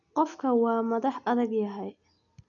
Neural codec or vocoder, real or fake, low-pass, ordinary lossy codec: none; real; 7.2 kHz; none